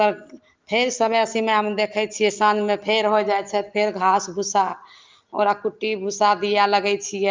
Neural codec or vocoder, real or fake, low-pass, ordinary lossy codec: none; real; 7.2 kHz; Opus, 32 kbps